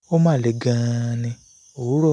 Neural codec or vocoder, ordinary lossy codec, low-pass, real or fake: none; none; 9.9 kHz; real